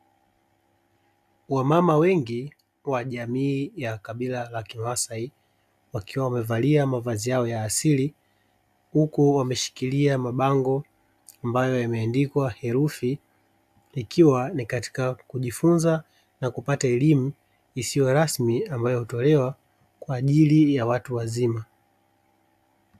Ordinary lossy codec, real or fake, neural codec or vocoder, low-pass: AAC, 96 kbps; real; none; 14.4 kHz